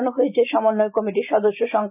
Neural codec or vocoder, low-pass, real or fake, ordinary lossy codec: none; 3.6 kHz; real; none